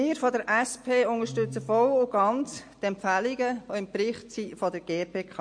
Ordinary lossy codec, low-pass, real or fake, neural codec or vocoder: MP3, 48 kbps; 9.9 kHz; real; none